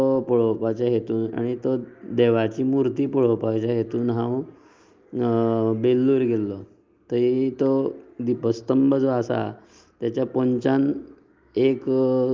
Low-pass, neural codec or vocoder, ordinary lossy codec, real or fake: 7.2 kHz; none; Opus, 24 kbps; real